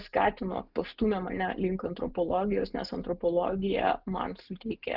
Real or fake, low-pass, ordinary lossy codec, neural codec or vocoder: real; 5.4 kHz; Opus, 24 kbps; none